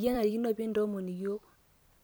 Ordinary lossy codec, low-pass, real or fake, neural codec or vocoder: none; none; fake; vocoder, 44.1 kHz, 128 mel bands every 256 samples, BigVGAN v2